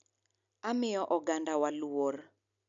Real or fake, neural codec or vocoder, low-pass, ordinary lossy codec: real; none; 7.2 kHz; none